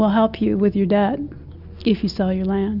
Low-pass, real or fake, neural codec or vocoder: 5.4 kHz; real; none